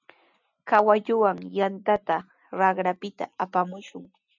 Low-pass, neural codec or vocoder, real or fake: 7.2 kHz; none; real